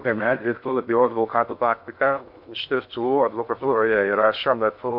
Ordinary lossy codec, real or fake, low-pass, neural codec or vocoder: MP3, 48 kbps; fake; 5.4 kHz; codec, 16 kHz in and 24 kHz out, 0.6 kbps, FocalCodec, streaming, 4096 codes